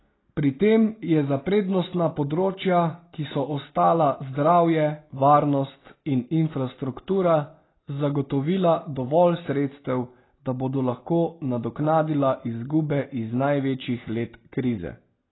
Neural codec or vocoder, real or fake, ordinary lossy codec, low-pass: none; real; AAC, 16 kbps; 7.2 kHz